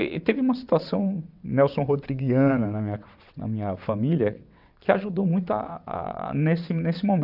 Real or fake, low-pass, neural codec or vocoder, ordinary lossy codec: fake; 5.4 kHz; vocoder, 22.05 kHz, 80 mel bands, WaveNeXt; none